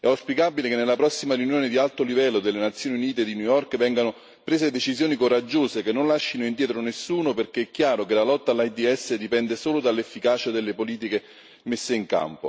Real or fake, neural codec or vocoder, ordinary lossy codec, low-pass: real; none; none; none